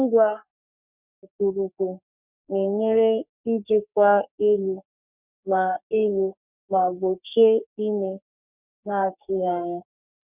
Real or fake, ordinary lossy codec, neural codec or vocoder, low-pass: fake; none; codec, 44.1 kHz, 3.4 kbps, Pupu-Codec; 3.6 kHz